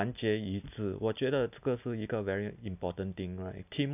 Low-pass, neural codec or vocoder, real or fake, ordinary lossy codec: 3.6 kHz; none; real; none